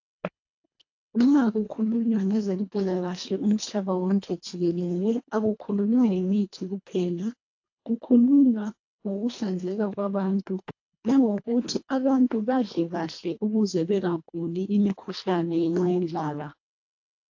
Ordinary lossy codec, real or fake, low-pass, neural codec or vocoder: AAC, 48 kbps; fake; 7.2 kHz; codec, 24 kHz, 1.5 kbps, HILCodec